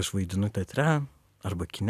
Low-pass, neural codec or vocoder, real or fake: 14.4 kHz; vocoder, 44.1 kHz, 128 mel bands every 256 samples, BigVGAN v2; fake